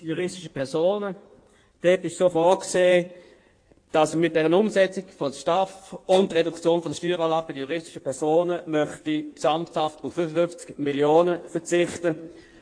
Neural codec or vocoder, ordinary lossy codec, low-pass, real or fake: codec, 16 kHz in and 24 kHz out, 1.1 kbps, FireRedTTS-2 codec; AAC, 48 kbps; 9.9 kHz; fake